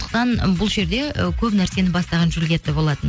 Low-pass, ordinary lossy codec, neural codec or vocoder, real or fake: none; none; none; real